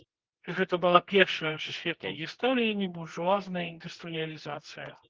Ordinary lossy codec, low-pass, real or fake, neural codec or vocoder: Opus, 16 kbps; 7.2 kHz; fake; codec, 24 kHz, 0.9 kbps, WavTokenizer, medium music audio release